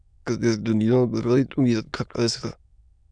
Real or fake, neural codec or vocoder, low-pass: fake; autoencoder, 22.05 kHz, a latent of 192 numbers a frame, VITS, trained on many speakers; 9.9 kHz